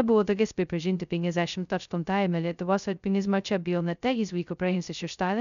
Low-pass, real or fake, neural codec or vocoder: 7.2 kHz; fake; codec, 16 kHz, 0.2 kbps, FocalCodec